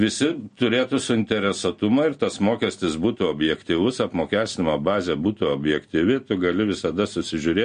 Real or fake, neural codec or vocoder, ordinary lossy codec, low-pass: real; none; MP3, 48 kbps; 9.9 kHz